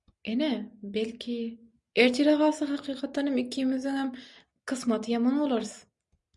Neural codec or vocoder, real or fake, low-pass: none; real; 10.8 kHz